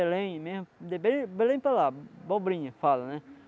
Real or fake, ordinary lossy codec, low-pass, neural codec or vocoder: real; none; none; none